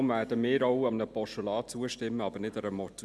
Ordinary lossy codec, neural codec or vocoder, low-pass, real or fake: none; none; none; real